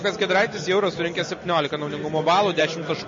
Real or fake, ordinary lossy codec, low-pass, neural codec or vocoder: real; MP3, 32 kbps; 7.2 kHz; none